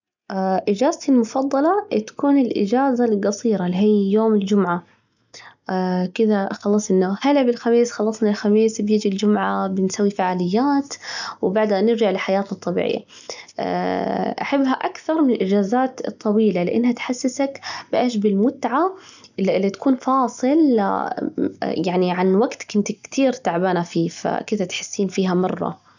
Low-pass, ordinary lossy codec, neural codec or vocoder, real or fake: 7.2 kHz; none; none; real